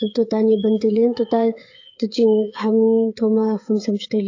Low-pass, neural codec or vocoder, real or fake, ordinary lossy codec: 7.2 kHz; codec, 16 kHz, 8 kbps, FreqCodec, smaller model; fake; AAC, 48 kbps